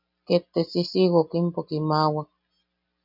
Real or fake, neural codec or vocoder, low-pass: real; none; 5.4 kHz